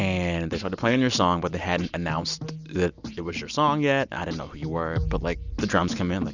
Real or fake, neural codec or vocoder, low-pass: real; none; 7.2 kHz